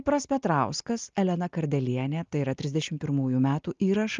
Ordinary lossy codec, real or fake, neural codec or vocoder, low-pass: Opus, 32 kbps; real; none; 7.2 kHz